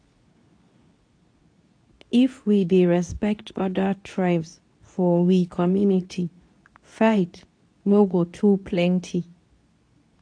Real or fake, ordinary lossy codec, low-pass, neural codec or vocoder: fake; AAC, 48 kbps; 9.9 kHz; codec, 24 kHz, 0.9 kbps, WavTokenizer, medium speech release version 2